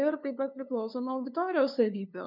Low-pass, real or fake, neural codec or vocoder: 5.4 kHz; fake; codec, 16 kHz, 2 kbps, FunCodec, trained on LibriTTS, 25 frames a second